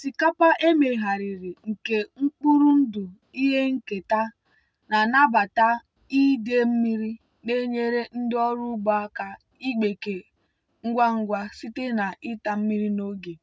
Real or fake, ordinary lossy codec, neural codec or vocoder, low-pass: real; none; none; none